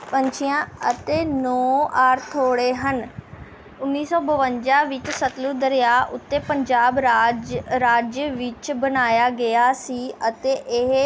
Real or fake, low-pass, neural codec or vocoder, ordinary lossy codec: real; none; none; none